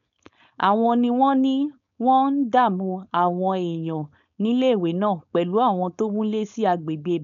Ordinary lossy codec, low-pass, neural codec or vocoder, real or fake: none; 7.2 kHz; codec, 16 kHz, 4.8 kbps, FACodec; fake